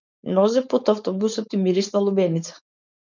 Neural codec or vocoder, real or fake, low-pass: codec, 16 kHz, 4.8 kbps, FACodec; fake; 7.2 kHz